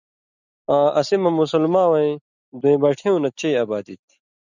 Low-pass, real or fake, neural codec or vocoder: 7.2 kHz; real; none